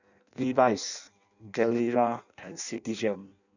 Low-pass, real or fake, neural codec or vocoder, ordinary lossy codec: 7.2 kHz; fake; codec, 16 kHz in and 24 kHz out, 0.6 kbps, FireRedTTS-2 codec; none